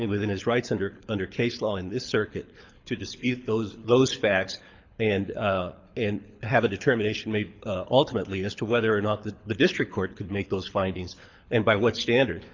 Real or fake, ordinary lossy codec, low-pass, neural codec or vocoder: fake; MP3, 64 kbps; 7.2 kHz; codec, 24 kHz, 6 kbps, HILCodec